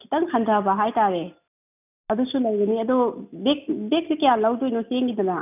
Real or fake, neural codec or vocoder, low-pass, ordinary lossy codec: real; none; 3.6 kHz; none